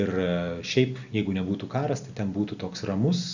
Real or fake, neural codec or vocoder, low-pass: real; none; 7.2 kHz